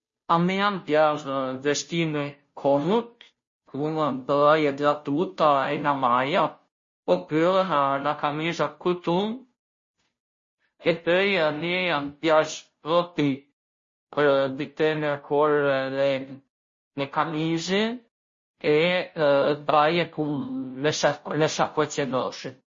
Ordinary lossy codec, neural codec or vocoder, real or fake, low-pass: MP3, 32 kbps; codec, 16 kHz, 0.5 kbps, FunCodec, trained on Chinese and English, 25 frames a second; fake; 7.2 kHz